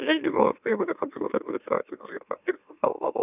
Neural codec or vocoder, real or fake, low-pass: autoencoder, 44.1 kHz, a latent of 192 numbers a frame, MeloTTS; fake; 3.6 kHz